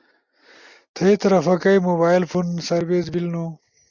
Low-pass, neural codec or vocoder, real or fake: 7.2 kHz; none; real